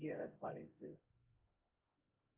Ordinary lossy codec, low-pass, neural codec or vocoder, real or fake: Opus, 32 kbps; 3.6 kHz; codec, 16 kHz, 0.5 kbps, X-Codec, HuBERT features, trained on LibriSpeech; fake